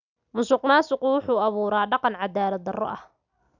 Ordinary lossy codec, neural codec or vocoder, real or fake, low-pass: none; none; real; 7.2 kHz